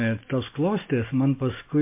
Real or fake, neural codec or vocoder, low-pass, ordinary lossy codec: real; none; 3.6 kHz; MP3, 24 kbps